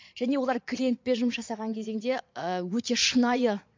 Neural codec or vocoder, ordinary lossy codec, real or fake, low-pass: vocoder, 22.05 kHz, 80 mel bands, Vocos; MP3, 48 kbps; fake; 7.2 kHz